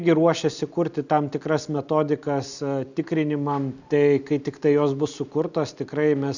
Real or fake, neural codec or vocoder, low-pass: real; none; 7.2 kHz